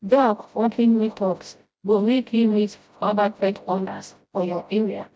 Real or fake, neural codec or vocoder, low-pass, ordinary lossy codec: fake; codec, 16 kHz, 0.5 kbps, FreqCodec, smaller model; none; none